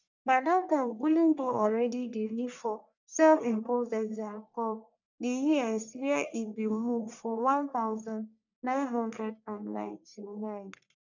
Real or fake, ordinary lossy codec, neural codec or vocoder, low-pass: fake; none; codec, 44.1 kHz, 1.7 kbps, Pupu-Codec; 7.2 kHz